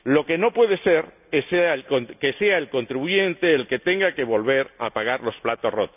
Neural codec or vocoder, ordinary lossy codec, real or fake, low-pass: none; none; real; 3.6 kHz